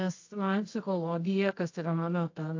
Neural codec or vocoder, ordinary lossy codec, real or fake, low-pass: codec, 24 kHz, 0.9 kbps, WavTokenizer, medium music audio release; AAC, 48 kbps; fake; 7.2 kHz